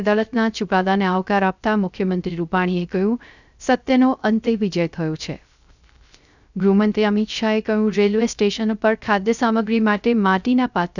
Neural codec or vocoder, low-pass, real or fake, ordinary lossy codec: codec, 16 kHz, 0.3 kbps, FocalCodec; 7.2 kHz; fake; none